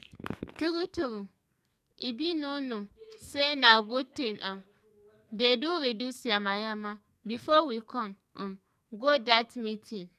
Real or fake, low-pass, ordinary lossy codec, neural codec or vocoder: fake; 14.4 kHz; none; codec, 44.1 kHz, 2.6 kbps, SNAC